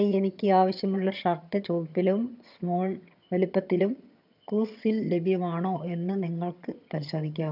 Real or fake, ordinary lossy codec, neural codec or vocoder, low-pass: fake; none; vocoder, 22.05 kHz, 80 mel bands, HiFi-GAN; 5.4 kHz